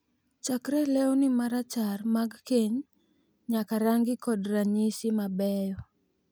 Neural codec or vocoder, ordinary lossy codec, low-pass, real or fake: none; none; none; real